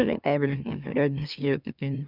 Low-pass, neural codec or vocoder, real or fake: 5.4 kHz; autoencoder, 44.1 kHz, a latent of 192 numbers a frame, MeloTTS; fake